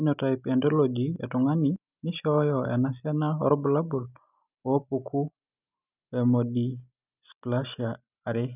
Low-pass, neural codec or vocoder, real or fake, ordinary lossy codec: 3.6 kHz; none; real; none